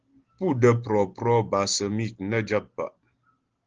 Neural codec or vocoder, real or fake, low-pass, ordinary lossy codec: none; real; 7.2 kHz; Opus, 16 kbps